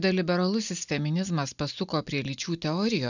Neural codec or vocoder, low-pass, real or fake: none; 7.2 kHz; real